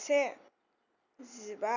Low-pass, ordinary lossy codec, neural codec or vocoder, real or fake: 7.2 kHz; none; none; real